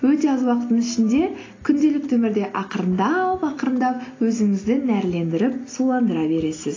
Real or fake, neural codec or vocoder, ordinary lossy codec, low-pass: real; none; AAC, 32 kbps; 7.2 kHz